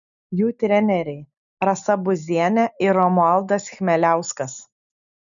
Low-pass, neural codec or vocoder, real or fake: 7.2 kHz; none; real